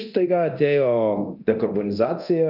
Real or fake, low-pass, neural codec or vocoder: fake; 5.4 kHz; codec, 24 kHz, 0.9 kbps, DualCodec